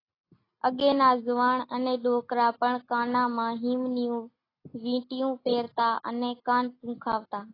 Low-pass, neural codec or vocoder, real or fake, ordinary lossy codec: 5.4 kHz; none; real; AAC, 24 kbps